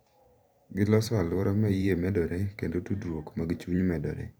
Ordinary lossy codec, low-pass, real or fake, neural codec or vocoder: none; none; fake; vocoder, 44.1 kHz, 128 mel bands every 512 samples, BigVGAN v2